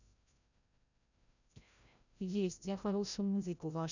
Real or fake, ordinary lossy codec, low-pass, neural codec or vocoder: fake; none; 7.2 kHz; codec, 16 kHz, 0.5 kbps, FreqCodec, larger model